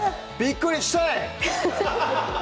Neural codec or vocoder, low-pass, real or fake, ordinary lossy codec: none; none; real; none